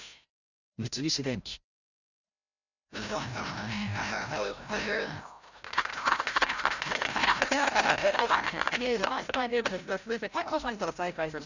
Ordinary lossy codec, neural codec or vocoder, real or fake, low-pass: none; codec, 16 kHz, 0.5 kbps, FreqCodec, larger model; fake; 7.2 kHz